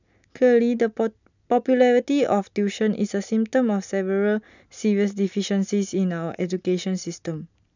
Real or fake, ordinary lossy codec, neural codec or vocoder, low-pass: real; none; none; 7.2 kHz